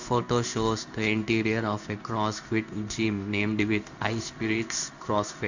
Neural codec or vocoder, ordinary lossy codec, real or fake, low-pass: codec, 16 kHz in and 24 kHz out, 1 kbps, XY-Tokenizer; none; fake; 7.2 kHz